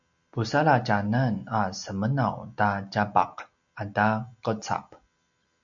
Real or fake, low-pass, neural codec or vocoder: real; 7.2 kHz; none